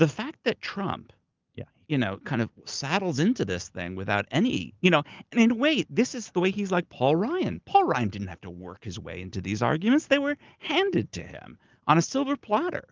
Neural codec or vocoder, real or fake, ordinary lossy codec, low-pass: none; real; Opus, 32 kbps; 7.2 kHz